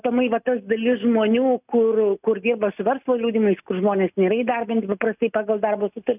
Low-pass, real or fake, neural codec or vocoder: 3.6 kHz; real; none